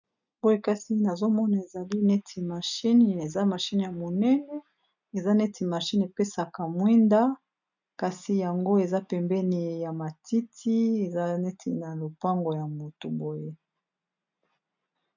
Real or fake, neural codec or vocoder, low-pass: real; none; 7.2 kHz